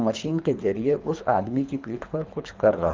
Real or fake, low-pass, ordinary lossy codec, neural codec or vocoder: fake; 7.2 kHz; Opus, 24 kbps; codec, 24 kHz, 3 kbps, HILCodec